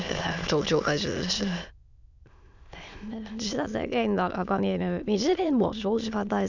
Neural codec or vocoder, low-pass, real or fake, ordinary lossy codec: autoencoder, 22.05 kHz, a latent of 192 numbers a frame, VITS, trained on many speakers; 7.2 kHz; fake; none